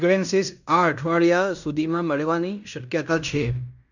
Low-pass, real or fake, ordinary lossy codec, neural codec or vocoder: 7.2 kHz; fake; none; codec, 16 kHz in and 24 kHz out, 0.9 kbps, LongCat-Audio-Codec, fine tuned four codebook decoder